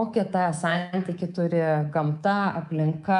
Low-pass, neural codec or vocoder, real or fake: 10.8 kHz; codec, 24 kHz, 3.1 kbps, DualCodec; fake